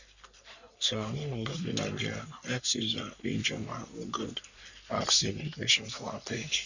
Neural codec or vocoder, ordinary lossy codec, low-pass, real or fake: codec, 44.1 kHz, 3.4 kbps, Pupu-Codec; none; 7.2 kHz; fake